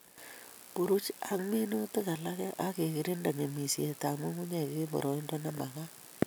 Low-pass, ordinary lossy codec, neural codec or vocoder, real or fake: none; none; none; real